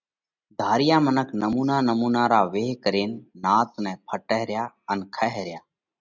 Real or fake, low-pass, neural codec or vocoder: real; 7.2 kHz; none